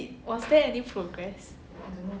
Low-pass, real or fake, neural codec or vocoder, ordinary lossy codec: none; real; none; none